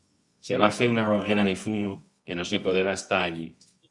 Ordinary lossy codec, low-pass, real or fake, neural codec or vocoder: Opus, 64 kbps; 10.8 kHz; fake; codec, 24 kHz, 0.9 kbps, WavTokenizer, medium music audio release